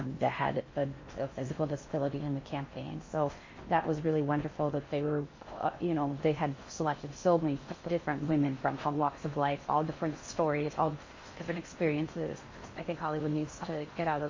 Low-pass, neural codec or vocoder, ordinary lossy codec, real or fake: 7.2 kHz; codec, 16 kHz in and 24 kHz out, 0.6 kbps, FocalCodec, streaming, 4096 codes; MP3, 32 kbps; fake